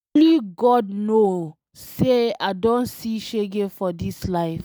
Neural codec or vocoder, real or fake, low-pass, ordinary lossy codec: none; real; none; none